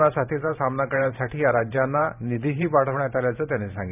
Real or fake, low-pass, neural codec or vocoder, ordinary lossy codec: fake; 3.6 kHz; vocoder, 44.1 kHz, 128 mel bands every 512 samples, BigVGAN v2; none